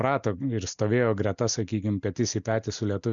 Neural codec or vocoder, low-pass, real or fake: none; 7.2 kHz; real